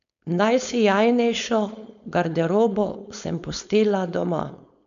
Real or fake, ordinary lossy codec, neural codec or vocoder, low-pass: fake; none; codec, 16 kHz, 4.8 kbps, FACodec; 7.2 kHz